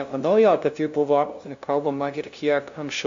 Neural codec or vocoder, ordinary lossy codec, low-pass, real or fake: codec, 16 kHz, 0.5 kbps, FunCodec, trained on LibriTTS, 25 frames a second; MP3, 48 kbps; 7.2 kHz; fake